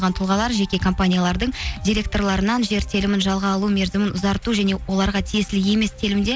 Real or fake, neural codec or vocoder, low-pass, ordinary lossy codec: real; none; none; none